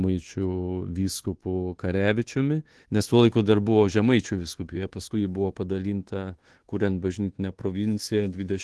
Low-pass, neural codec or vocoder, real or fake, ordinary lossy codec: 10.8 kHz; codec, 24 kHz, 1.2 kbps, DualCodec; fake; Opus, 16 kbps